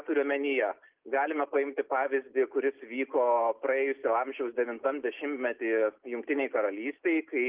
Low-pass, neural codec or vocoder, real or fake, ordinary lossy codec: 3.6 kHz; none; real; Opus, 32 kbps